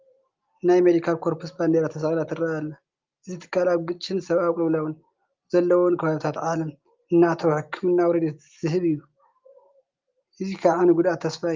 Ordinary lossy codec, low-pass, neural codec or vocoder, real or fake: Opus, 32 kbps; 7.2 kHz; none; real